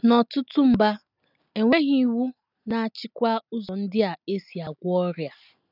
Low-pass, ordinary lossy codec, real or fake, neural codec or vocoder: 5.4 kHz; none; real; none